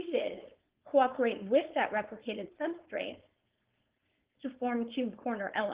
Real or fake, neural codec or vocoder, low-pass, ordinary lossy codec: fake; codec, 16 kHz, 4.8 kbps, FACodec; 3.6 kHz; Opus, 16 kbps